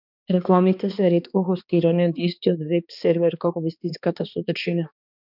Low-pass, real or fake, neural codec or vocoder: 5.4 kHz; fake; codec, 16 kHz, 2 kbps, X-Codec, HuBERT features, trained on balanced general audio